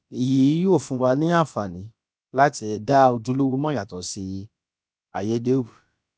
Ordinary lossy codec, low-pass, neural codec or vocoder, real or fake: none; none; codec, 16 kHz, about 1 kbps, DyCAST, with the encoder's durations; fake